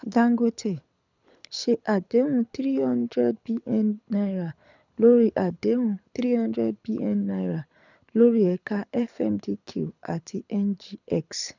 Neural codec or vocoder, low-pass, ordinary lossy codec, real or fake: codec, 24 kHz, 6 kbps, HILCodec; 7.2 kHz; none; fake